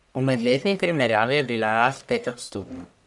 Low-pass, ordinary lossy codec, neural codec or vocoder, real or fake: 10.8 kHz; none; codec, 44.1 kHz, 1.7 kbps, Pupu-Codec; fake